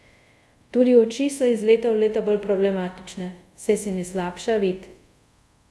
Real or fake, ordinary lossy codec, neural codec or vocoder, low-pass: fake; none; codec, 24 kHz, 0.5 kbps, DualCodec; none